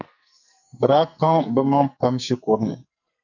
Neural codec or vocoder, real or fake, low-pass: codec, 44.1 kHz, 2.6 kbps, SNAC; fake; 7.2 kHz